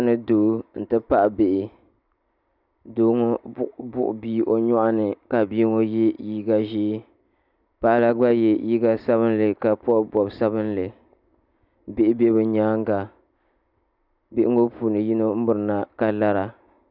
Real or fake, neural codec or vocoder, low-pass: real; none; 5.4 kHz